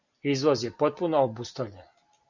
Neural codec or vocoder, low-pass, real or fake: none; 7.2 kHz; real